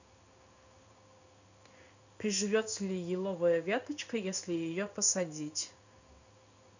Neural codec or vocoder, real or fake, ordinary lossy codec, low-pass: codec, 16 kHz in and 24 kHz out, 1 kbps, XY-Tokenizer; fake; none; 7.2 kHz